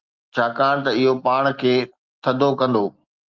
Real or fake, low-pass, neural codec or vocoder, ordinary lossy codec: real; 7.2 kHz; none; Opus, 24 kbps